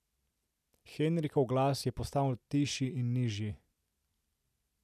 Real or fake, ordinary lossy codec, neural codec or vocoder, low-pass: real; none; none; 14.4 kHz